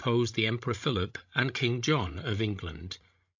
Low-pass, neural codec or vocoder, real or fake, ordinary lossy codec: 7.2 kHz; codec, 16 kHz, 16 kbps, FreqCodec, larger model; fake; MP3, 64 kbps